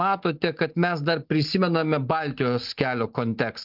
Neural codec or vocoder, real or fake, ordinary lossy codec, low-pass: none; real; Opus, 24 kbps; 5.4 kHz